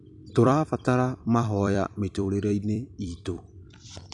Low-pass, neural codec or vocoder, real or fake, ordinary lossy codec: 10.8 kHz; vocoder, 44.1 kHz, 128 mel bands every 256 samples, BigVGAN v2; fake; none